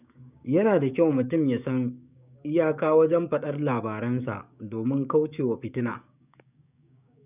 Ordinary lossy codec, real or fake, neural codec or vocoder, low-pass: none; fake; codec, 16 kHz, 6 kbps, DAC; 3.6 kHz